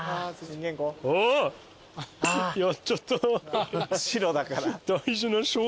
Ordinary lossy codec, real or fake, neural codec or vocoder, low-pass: none; real; none; none